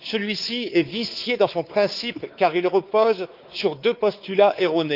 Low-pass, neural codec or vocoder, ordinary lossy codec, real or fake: 5.4 kHz; codec, 16 kHz, 4 kbps, X-Codec, WavLM features, trained on Multilingual LibriSpeech; Opus, 32 kbps; fake